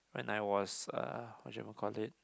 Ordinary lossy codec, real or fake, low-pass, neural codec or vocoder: none; real; none; none